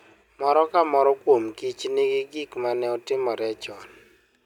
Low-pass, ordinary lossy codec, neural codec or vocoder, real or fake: 19.8 kHz; none; none; real